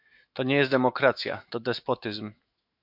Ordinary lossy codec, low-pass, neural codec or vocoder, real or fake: MP3, 48 kbps; 5.4 kHz; autoencoder, 48 kHz, 128 numbers a frame, DAC-VAE, trained on Japanese speech; fake